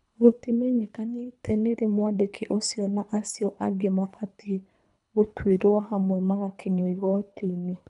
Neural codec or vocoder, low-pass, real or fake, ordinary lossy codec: codec, 24 kHz, 3 kbps, HILCodec; 10.8 kHz; fake; none